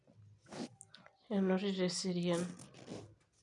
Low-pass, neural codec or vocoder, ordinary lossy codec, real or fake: 10.8 kHz; none; none; real